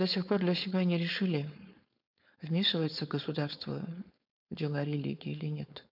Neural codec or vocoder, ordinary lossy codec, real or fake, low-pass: codec, 16 kHz, 4.8 kbps, FACodec; none; fake; 5.4 kHz